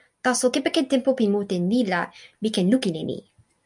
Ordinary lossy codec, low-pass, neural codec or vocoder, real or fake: MP3, 96 kbps; 10.8 kHz; none; real